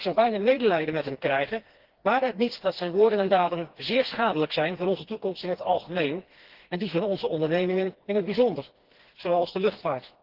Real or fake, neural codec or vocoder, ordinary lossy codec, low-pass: fake; codec, 16 kHz, 2 kbps, FreqCodec, smaller model; Opus, 16 kbps; 5.4 kHz